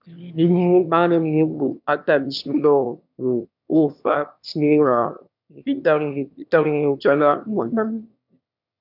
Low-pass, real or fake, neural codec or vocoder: 5.4 kHz; fake; autoencoder, 22.05 kHz, a latent of 192 numbers a frame, VITS, trained on one speaker